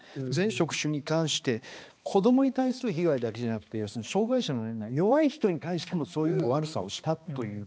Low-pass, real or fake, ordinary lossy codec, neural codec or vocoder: none; fake; none; codec, 16 kHz, 2 kbps, X-Codec, HuBERT features, trained on balanced general audio